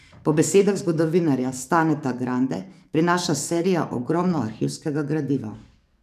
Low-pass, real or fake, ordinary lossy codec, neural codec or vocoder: 14.4 kHz; fake; none; codec, 44.1 kHz, 7.8 kbps, DAC